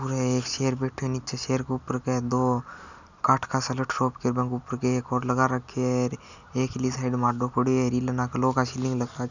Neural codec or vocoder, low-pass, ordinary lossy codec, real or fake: none; 7.2 kHz; none; real